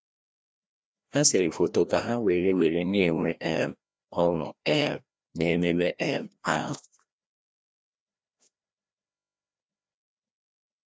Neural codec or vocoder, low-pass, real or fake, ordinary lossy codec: codec, 16 kHz, 1 kbps, FreqCodec, larger model; none; fake; none